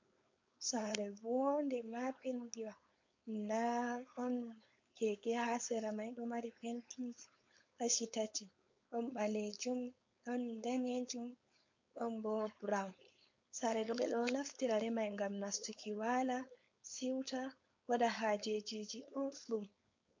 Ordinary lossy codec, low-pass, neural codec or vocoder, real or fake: MP3, 48 kbps; 7.2 kHz; codec, 16 kHz, 4.8 kbps, FACodec; fake